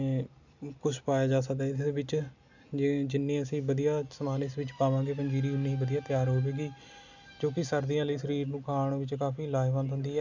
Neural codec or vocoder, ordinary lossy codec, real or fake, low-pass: none; none; real; 7.2 kHz